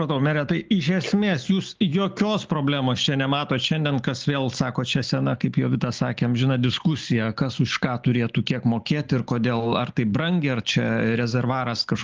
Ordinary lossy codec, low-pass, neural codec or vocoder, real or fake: Opus, 32 kbps; 7.2 kHz; none; real